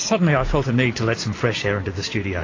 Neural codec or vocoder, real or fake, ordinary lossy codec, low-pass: none; real; AAC, 32 kbps; 7.2 kHz